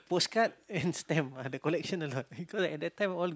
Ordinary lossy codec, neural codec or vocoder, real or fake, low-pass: none; none; real; none